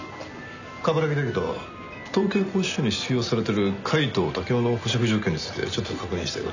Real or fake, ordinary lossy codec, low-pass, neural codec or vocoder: real; none; 7.2 kHz; none